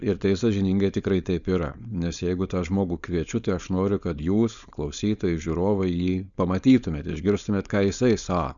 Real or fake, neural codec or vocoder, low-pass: fake; codec, 16 kHz, 4.8 kbps, FACodec; 7.2 kHz